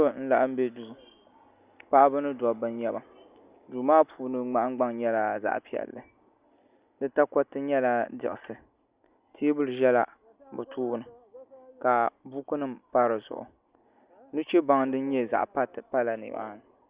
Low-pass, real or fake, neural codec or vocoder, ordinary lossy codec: 3.6 kHz; real; none; Opus, 64 kbps